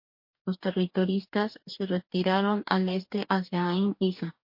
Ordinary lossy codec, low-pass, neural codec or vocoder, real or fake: MP3, 32 kbps; 5.4 kHz; codec, 44.1 kHz, 2.6 kbps, DAC; fake